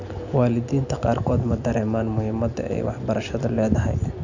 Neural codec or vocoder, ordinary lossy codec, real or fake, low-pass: none; none; real; 7.2 kHz